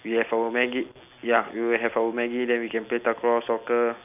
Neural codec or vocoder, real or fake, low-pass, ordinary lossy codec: none; real; 3.6 kHz; none